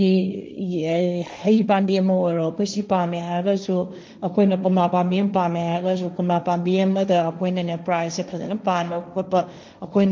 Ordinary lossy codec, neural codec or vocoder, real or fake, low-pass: none; codec, 16 kHz, 1.1 kbps, Voila-Tokenizer; fake; none